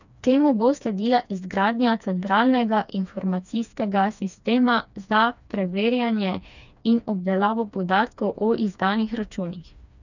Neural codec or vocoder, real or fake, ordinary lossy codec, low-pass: codec, 16 kHz, 2 kbps, FreqCodec, smaller model; fake; none; 7.2 kHz